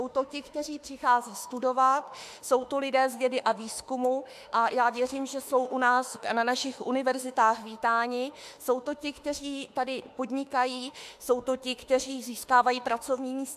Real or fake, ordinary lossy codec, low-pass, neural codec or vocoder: fake; AAC, 96 kbps; 14.4 kHz; autoencoder, 48 kHz, 32 numbers a frame, DAC-VAE, trained on Japanese speech